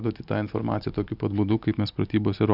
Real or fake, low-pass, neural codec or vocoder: real; 5.4 kHz; none